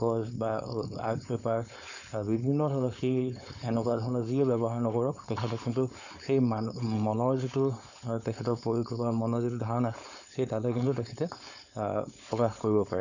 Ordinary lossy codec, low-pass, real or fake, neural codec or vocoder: none; 7.2 kHz; fake; codec, 16 kHz, 4.8 kbps, FACodec